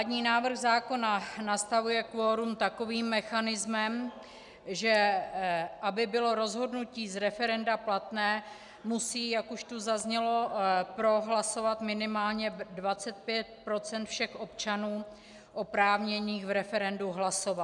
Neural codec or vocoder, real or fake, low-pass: none; real; 10.8 kHz